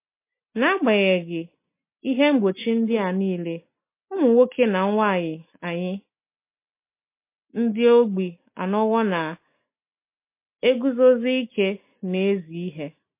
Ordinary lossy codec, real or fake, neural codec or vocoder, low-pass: MP3, 24 kbps; real; none; 3.6 kHz